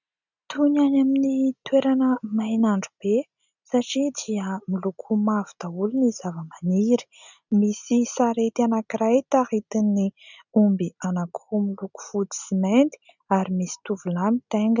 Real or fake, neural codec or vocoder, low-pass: real; none; 7.2 kHz